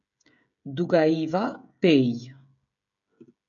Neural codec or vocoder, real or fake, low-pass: codec, 16 kHz, 16 kbps, FreqCodec, smaller model; fake; 7.2 kHz